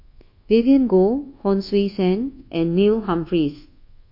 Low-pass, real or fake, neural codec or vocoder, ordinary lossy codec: 5.4 kHz; fake; codec, 24 kHz, 1.2 kbps, DualCodec; MP3, 32 kbps